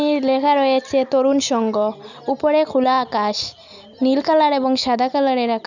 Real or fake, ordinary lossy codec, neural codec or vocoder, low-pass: real; none; none; 7.2 kHz